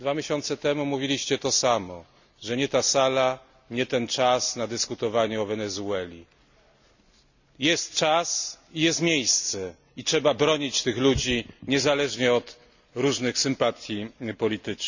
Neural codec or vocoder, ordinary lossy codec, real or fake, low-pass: none; none; real; 7.2 kHz